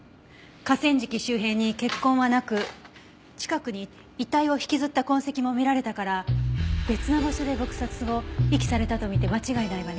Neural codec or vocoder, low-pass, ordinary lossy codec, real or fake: none; none; none; real